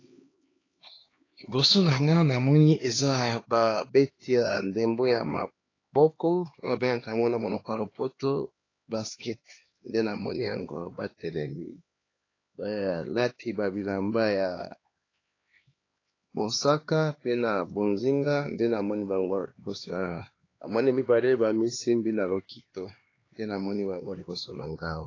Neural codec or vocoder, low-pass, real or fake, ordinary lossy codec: codec, 16 kHz, 2 kbps, X-Codec, HuBERT features, trained on LibriSpeech; 7.2 kHz; fake; AAC, 32 kbps